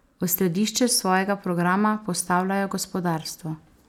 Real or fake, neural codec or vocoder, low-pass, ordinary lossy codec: real; none; 19.8 kHz; none